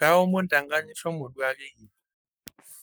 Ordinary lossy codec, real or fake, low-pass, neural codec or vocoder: none; fake; none; codec, 44.1 kHz, 7.8 kbps, Pupu-Codec